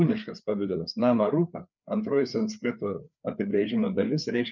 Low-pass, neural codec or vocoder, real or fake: 7.2 kHz; codec, 16 kHz, 4 kbps, FreqCodec, larger model; fake